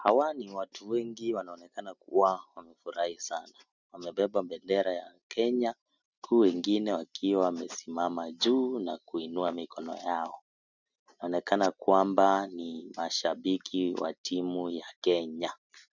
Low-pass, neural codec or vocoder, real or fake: 7.2 kHz; none; real